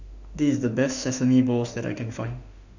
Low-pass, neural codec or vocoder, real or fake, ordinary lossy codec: 7.2 kHz; autoencoder, 48 kHz, 32 numbers a frame, DAC-VAE, trained on Japanese speech; fake; none